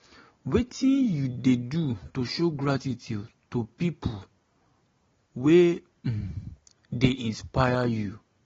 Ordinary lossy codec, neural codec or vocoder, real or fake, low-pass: AAC, 24 kbps; none; real; 7.2 kHz